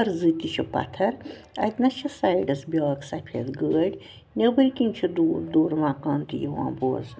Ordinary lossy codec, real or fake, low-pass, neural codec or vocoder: none; real; none; none